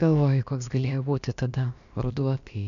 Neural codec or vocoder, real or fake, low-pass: codec, 16 kHz, about 1 kbps, DyCAST, with the encoder's durations; fake; 7.2 kHz